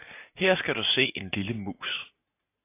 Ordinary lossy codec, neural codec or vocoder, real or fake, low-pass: AAC, 24 kbps; none; real; 3.6 kHz